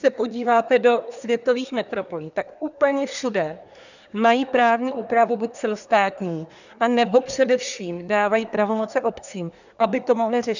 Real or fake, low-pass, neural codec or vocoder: fake; 7.2 kHz; codec, 24 kHz, 1 kbps, SNAC